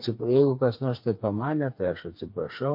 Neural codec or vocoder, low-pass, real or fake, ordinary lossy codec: codec, 16 kHz, 4 kbps, FreqCodec, smaller model; 5.4 kHz; fake; MP3, 32 kbps